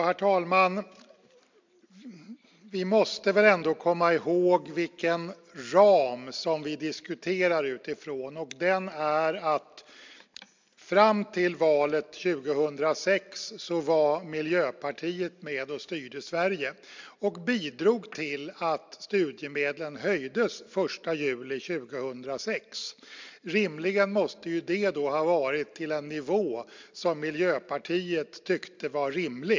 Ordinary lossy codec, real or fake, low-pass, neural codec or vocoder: MP3, 64 kbps; real; 7.2 kHz; none